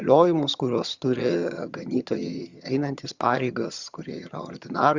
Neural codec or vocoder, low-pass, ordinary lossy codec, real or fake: vocoder, 22.05 kHz, 80 mel bands, HiFi-GAN; 7.2 kHz; Opus, 64 kbps; fake